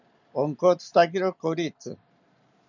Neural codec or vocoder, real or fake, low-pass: none; real; 7.2 kHz